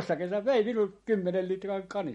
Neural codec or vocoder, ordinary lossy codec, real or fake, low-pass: none; MP3, 48 kbps; real; 19.8 kHz